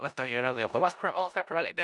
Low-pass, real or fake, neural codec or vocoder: 10.8 kHz; fake; codec, 16 kHz in and 24 kHz out, 0.4 kbps, LongCat-Audio-Codec, four codebook decoder